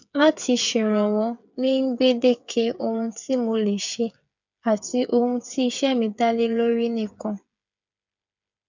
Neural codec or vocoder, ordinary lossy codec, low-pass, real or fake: codec, 16 kHz, 8 kbps, FreqCodec, smaller model; none; 7.2 kHz; fake